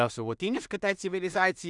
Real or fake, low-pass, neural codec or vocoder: fake; 10.8 kHz; codec, 16 kHz in and 24 kHz out, 0.4 kbps, LongCat-Audio-Codec, two codebook decoder